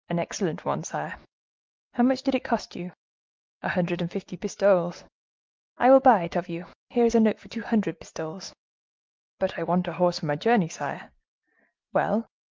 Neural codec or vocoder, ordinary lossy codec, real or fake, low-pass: none; Opus, 32 kbps; real; 7.2 kHz